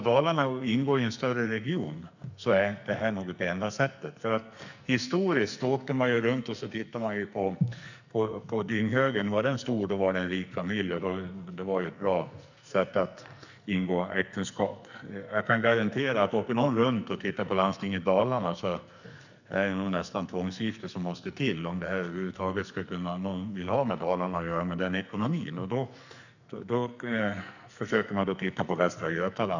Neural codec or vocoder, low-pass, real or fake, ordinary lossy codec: codec, 44.1 kHz, 2.6 kbps, SNAC; 7.2 kHz; fake; none